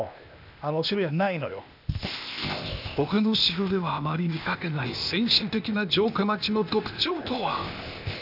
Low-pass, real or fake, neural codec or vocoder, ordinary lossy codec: 5.4 kHz; fake; codec, 16 kHz, 0.8 kbps, ZipCodec; none